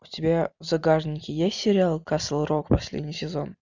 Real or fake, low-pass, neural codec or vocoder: real; 7.2 kHz; none